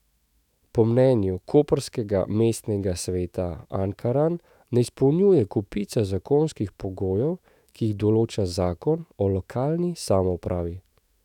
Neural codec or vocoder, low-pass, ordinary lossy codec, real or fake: autoencoder, 48 kHz, 128 numbers a frame, DAC-VAE, trained on Japanese speech; 19.8 kHz; none; fake